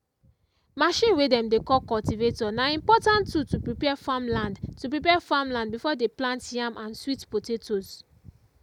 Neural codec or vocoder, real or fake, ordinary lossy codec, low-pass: none; real; none; 19.8 kHz